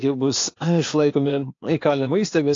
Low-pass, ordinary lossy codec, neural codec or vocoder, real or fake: 7.2 kHz; AAC, 48 kbps; codec, 16 kHz, 0.8 kbps, ZipCodec; fake